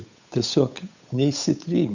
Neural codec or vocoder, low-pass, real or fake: codec, 16 kHz, 8 kbps, FunCodec, trained on Chinese and English, 25 frames a second; 7.2 kHz; fake